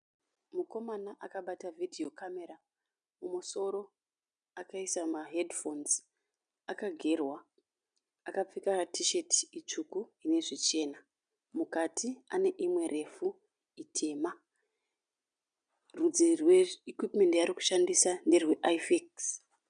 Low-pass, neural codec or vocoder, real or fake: 9.9 kHz; none; real